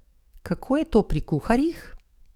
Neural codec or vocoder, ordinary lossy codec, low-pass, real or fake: codec, 44.1 kHz, 7.8 kbps, DAC; none; 19.8 kHz; fake